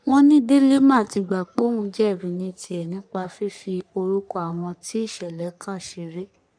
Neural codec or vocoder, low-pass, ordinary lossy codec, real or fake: codec, 44.1 kHz, 3.4 kbps, Pupu-Codec; 9.9 kHz; none; fake